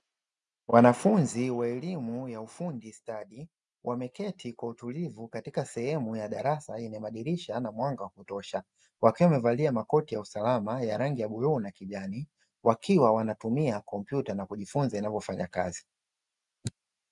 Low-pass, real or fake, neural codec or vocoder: 10.8 kHz; real; none